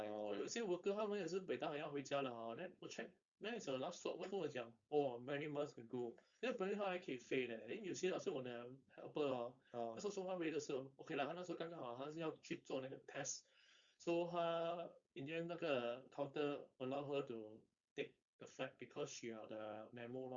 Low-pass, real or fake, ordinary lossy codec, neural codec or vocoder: 7.2 kHz; fake; Opus, 64 kbps; codec, 16 kHz, 4.8 kbps, FACodec